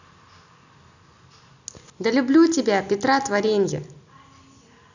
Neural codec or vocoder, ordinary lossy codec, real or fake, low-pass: none; none; real; 7.2 kHz